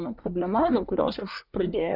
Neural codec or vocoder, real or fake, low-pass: codec, 24 kHz, 1 kbps, SNAC; fake; 5.4 kHz